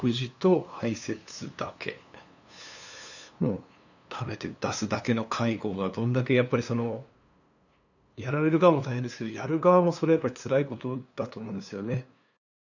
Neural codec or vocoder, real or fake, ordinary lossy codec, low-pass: codec, 16 kHz, 2 kbps, FunCodec, trained on LibriTTS, 25 frames a second; fake; none; 7.2 kHz